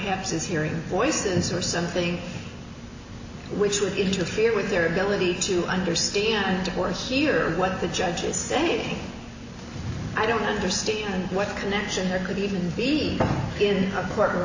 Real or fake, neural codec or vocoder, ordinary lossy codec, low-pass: real; none; AAC, 48 kbps; 7.2 kHz